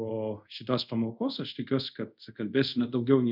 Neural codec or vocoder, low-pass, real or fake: codec, 24 kHz, 0.5 kbps, DualCodec; 5.4 kHz; fake